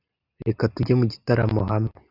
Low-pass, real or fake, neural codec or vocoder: 5.4 kHz; real; none